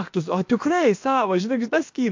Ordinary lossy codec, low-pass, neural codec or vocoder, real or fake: MP3, 48 kbps; 7.2 kHz; codec, 16 kHz, about 1 kbps, DyCAST, with the encoder's durations; fake